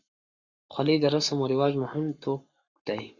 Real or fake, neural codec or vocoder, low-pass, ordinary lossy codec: fake; codec, 44.1 kHz, 7.8 kbps, Pupu-Codec; 7.2 kHz; Opus, 64 kbps